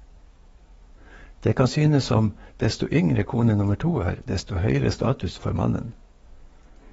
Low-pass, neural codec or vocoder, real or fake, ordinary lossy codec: 19.8 kHz; codec, 44.1 kHz, 7.8 kbps, DAC; fake; AAC, 24 kbps